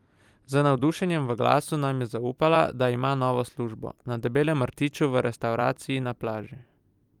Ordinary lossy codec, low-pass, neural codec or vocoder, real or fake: Opus, 32 kbps; 19.8 kHz; vocoder, 44.1 kHz, 128 mel bands every 256 samples, BigVGAN v2; fake